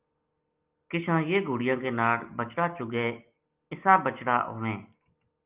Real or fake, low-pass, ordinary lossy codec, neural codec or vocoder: real; 3.6 kHz; Opus, 24 kbps; none